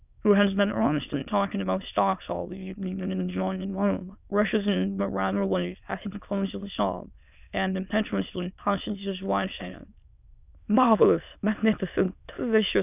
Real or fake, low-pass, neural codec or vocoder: fake; 3.6 kHz; autoencoder, 22.05 kHz, a latent of 192 numbers a frame, VITS, trained on many speakers